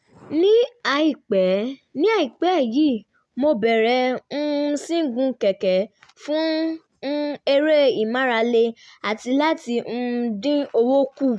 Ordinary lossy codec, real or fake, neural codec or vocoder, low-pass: none; real; none; none